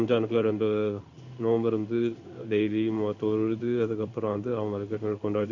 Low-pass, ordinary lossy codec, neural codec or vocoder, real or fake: 7.2 kHz; none; codec, 16 kHz in and 24 kHz out, 1 kbps, XY-Tokenizer; fake